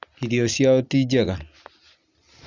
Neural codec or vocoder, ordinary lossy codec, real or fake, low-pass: none; Opus, 64 kbps; real; 7.2 kHz